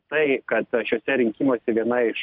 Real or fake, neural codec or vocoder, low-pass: real; none; 5.4 kHz